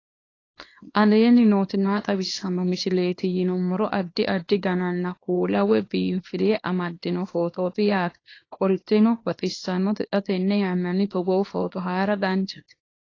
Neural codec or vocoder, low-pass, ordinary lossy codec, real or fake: codec, 24 kHz, 0.9 kbps, WavTokenizer, small release; 7.2 kHz; AAC, 32 kbps; fake